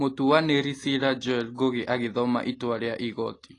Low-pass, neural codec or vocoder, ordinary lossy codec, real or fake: 10.8 kHz; none; AAC, 32 kbps; real